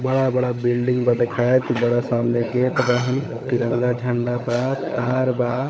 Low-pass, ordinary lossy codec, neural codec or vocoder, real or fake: none; none; codec, 16 kHz, 16 kbps, FunCodec, trained on LibriTTS, 50 frames a second; fake